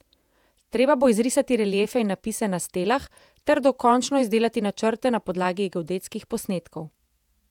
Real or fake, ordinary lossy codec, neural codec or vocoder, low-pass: fake; none; vocoder, 48 kHz, 128 mel bands, Vocos; 19.8 kHz